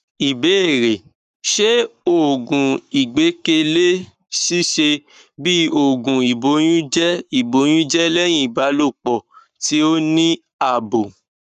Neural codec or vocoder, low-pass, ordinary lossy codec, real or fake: codec, 44.1 kHz, 7.8 kbps, Pupu-Codec; 14.4 kHz; none; fake